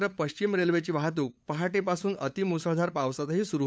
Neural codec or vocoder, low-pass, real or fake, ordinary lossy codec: codec, 16 kHz, 8 kbps, FunCodec, trained on LibriTTS, 25 frames a second; none; fake; none